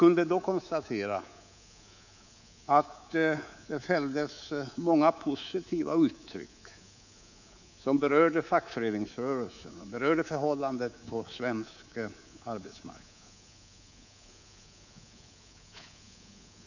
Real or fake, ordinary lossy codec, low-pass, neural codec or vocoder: fake; none; 7.2 kHz; codec, 24 kHz, 3.1 kbps, DualCodec